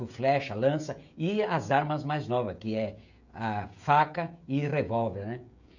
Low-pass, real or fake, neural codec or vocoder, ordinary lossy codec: 7.2 kHz; fake; codec, 16 kHz, 16 kbps, FreqCodec, smaller model; none